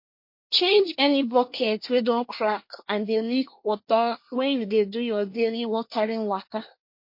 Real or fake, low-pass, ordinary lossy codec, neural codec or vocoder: fake; 5.4 kHz; MP3, 32 kbps; codec, 24 kHz, 1 kbps, SNAC